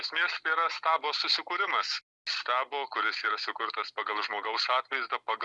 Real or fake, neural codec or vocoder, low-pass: real; none; 10.8 kHz